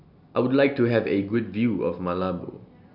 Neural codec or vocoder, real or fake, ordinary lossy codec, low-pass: none; real; none; 5.4 kHz